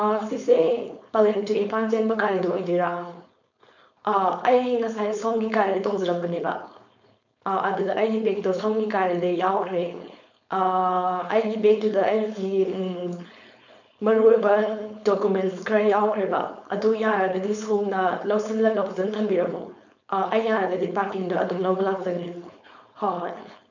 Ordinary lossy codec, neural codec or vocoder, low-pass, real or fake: none; codec, 16 kHz, 4.8 kbps, FACodec; 7.2 kHz; fake